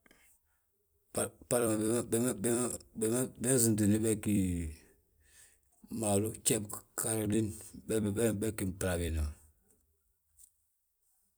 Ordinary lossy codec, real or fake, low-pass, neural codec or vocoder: none; fake; none; vocoder, 44.1 kHz, 128 mel bands every 256 samples, BigVGAN v2